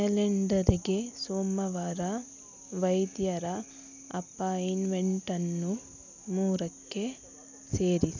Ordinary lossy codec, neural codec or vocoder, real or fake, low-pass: none; none; real; 7.2 kHz